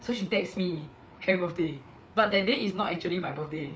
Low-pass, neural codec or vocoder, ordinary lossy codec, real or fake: none; codec, 16 kHz, 4 kbps, FreqCodec, larger model; none; fake